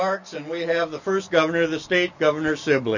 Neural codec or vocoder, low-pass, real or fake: none; 7.2 kHz; real